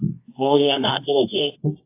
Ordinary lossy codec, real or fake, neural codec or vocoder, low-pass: AAC, 24 kbps; fake; codec, 24 kHz, 0.9 kbps, WavTokenizer, medium music audio release; 3.6 kHz